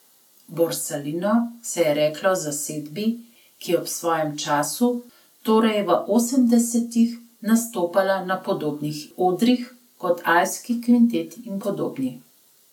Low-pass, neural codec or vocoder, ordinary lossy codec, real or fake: 19.8 kHz; none; none; real